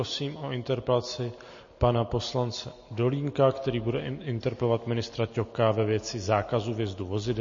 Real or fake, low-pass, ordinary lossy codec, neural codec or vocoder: real; 7.2 kHz; MP3, 32 kbps; none